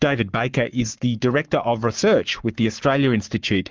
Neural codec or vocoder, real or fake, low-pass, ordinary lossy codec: codec, 44.1 kHz, 7.8 kbps, Pupu-Codec; fake; 7.2 kHz; Opus, 24 kbps